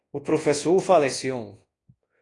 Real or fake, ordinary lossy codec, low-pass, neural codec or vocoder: fake; AAC, 32 kbps; 10.8 kHz; codec, 24 kHz, 0.9 kbps, WavTokenizer, large speech release